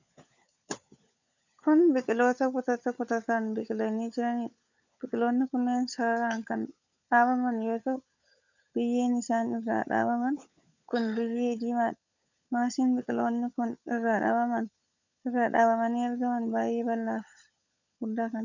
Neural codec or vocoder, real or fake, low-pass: codec, 16 kHz, 16 kbps, FunCodec, trained on LibriTTS, 50 frames a second; fake; 7.2 kHz